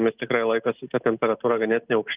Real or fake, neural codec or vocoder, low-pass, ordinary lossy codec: real; none; 3.6 kHz; Opus, 24 kbps